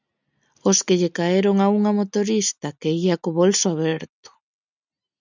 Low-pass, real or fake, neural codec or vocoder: 7.2 kHz; real; none